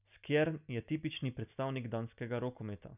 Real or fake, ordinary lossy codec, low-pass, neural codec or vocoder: real; none; 3.6 kHz; none